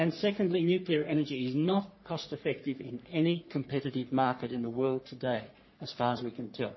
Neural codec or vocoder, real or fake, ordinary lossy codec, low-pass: codec, 44.1 kHz, 3.4 kbps, Pupu-Codec; fake; MP3, 24 kbps; 7.2 kHz